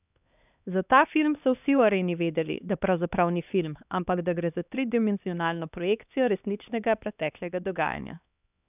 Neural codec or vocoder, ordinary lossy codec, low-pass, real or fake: codec, 16 kHz, 2 kbps, X-Codec, HuBERT features, trained on LibriSpeech; none; 3.6 kHz; fake